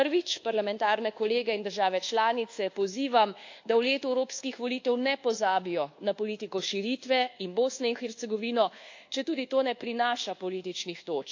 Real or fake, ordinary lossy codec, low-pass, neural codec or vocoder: fake; AAC, 48 kbps; 7.2 kHz; codec, 24 kHz, 1.2 kbps, DualCodec